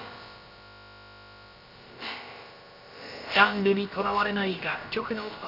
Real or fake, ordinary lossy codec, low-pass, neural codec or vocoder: fake; none; 5.4 kHz; codec, 16 kHz, about 1 kbps, DyCAST, with the encoder's durations